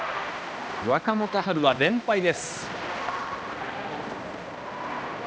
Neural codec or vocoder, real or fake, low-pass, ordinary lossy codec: codec, 16 kHz, 1 kbps, X-Codec, HuBERT features, trained on balanced general audio; fake; none; none